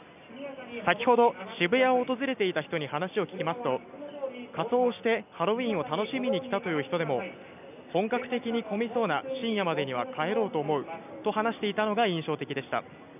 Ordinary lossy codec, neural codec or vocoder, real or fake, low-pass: none; none; real; 3.6 kHz